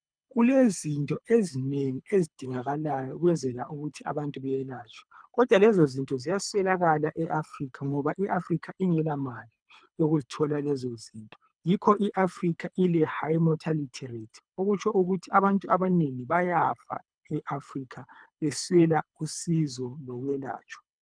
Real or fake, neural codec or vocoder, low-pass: fake; codec, 24 kHz, 3 kbps, HILCodec; 9.9 kHz